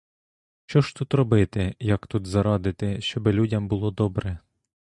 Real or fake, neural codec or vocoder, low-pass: real; none; 10.8 kHz